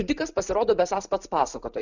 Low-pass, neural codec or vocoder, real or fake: 7.2 kHz; none; real